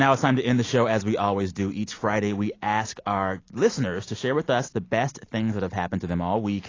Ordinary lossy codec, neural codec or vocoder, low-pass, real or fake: AAC, 32 kbps; none; 7.2 kHz; real